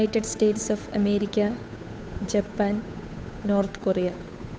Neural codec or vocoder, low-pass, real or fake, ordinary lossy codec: codec, 16 kHz, 8 kbps, FunCodec, trained on Chinese and English, 25 frames a second; none; fake; none